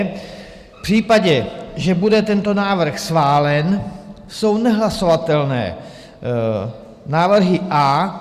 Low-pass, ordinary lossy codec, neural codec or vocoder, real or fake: 14.4 kHz; Opus, 64 kbps; none; real